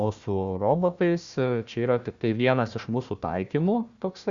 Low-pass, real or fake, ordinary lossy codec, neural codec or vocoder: 7.2 kHz; fake; Opus, 64 kbps; codec, 16 kHz, 1 kbps, FunCodec, trained on Chinese and English, 50 frames a second